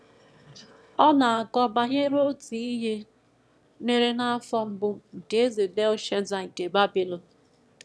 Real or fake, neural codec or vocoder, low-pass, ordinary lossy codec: fake; autoencoder, 22.05 kHz, a latent of 192 numbers a frame, VITS, trained on one speaker; none; none